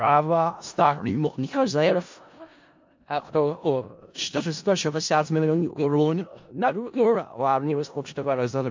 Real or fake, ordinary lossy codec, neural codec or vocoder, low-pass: fake; MP3, 48 kbps; codec, 16 kHz in and 24 kHz out, 0.4 kbps, LongCat-Audio-Codec, four codebook decoder; 7.2 kHz